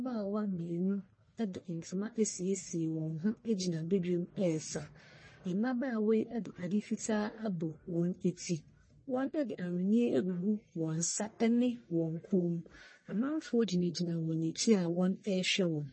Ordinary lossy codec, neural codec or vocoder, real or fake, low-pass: MP3, 32 kbps; codec, 44.1 kHz, 1.7 kbps, Pupu-Codec; fake; 9.9 kHz